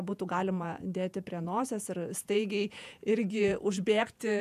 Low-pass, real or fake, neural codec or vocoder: 14.4 kHz; fake; vocoder, 48 kHz, 128 mel bands, Vocos